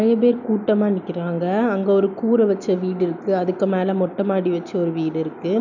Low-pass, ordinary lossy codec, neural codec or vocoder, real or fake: 7.2 kHz; none; none; real